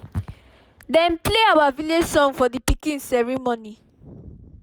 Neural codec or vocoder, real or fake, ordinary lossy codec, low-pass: none; real; none; none